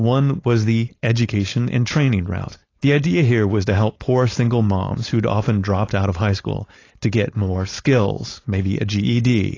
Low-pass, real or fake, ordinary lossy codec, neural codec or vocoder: 7.2 kHz; fake; AAC, 32 kbps; codec, 16 kHz, 4.8 kbps, FACodec